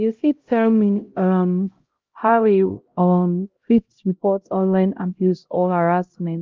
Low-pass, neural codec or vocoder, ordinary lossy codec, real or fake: 7.2 kHz; codec, 16 kHz, 0.5 kbps, X-Codec, HuBERT features, trained on LibriSpeech; Opus, 32 kbps; fake